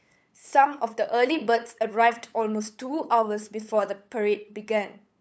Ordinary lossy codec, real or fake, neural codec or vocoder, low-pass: none; fake; codec, 16 kHz, 8 kbps, FunCodec, trained on LibriTTS, 25 frames a second; none